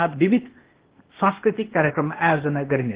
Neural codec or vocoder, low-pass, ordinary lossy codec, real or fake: codec, 16 kHz, 0.8 kbps, ZipCodec; 3.6 kHz; Opus, 16 kbps; fake